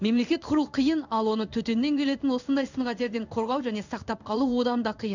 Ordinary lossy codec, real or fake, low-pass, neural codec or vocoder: none; fake; 7.2 kHz; codec, 16 kHz in and 24 kHz out, 1 kbps, XY-Tokenizer